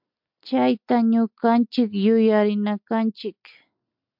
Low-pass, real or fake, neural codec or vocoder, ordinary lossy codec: 5.4 kHz; real; none; MP3, 48 kbps